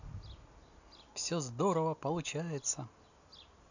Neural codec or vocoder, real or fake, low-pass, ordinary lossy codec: none; real; 7.2 kHz; none